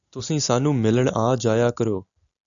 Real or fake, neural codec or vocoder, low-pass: real; none; 7.2 kHz